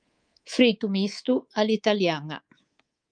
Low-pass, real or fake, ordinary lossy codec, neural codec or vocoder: 9.9 kHz; fake; Opus, 32 kbps; codec, 24 kHz, 3.1 kbps, DualCodec